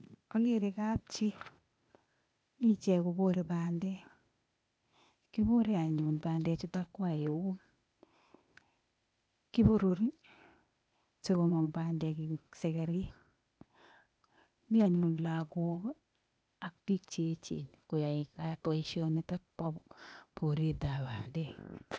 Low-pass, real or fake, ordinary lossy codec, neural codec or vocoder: none; fake; none; codec, 16 kHz, 0.8 kbps, ZipCodec